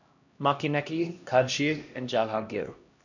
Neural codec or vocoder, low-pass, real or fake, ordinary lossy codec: codec, 16 kHz, 1 kbps, X-Codec, HuBERT features, trained on LibriSpeech; 7.2 kHz; fake; none